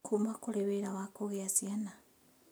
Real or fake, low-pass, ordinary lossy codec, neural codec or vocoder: real; none; none; none